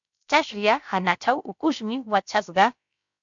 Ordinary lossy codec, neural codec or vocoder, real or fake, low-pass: MP3, 64 kbps; codec, 16 kHz, about 1 kbps, DyCAST, with the encoder's durations; fake; 7.2 kHz